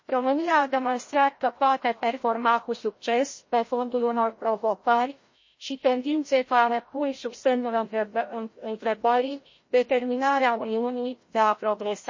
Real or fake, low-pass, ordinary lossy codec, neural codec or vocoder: fake; 7.2 kHz; MP3, 32 kbps; codec, 16 kHz, 0.5 kbps, FreqCodec, larger model